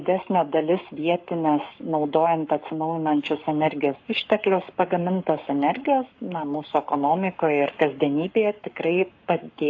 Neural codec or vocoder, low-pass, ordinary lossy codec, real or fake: codec, 44.1 kHz, 7.8 kbps, Pupu-Codec; 7.2 kHz; AAC, 48 kbps; fake